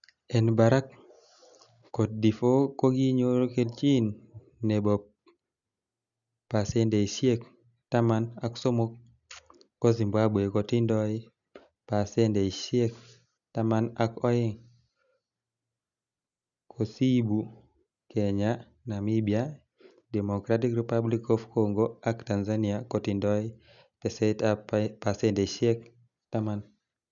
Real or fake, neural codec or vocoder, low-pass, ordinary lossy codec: real; none; 7.2 kHz; none